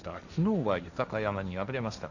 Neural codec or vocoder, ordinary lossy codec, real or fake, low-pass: codec, 16 kHz, 1.1 kbps, Voila-Tokenizer; none; fake; 7.2 kHz